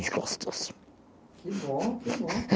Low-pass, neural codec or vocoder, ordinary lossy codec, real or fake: none; none; none; real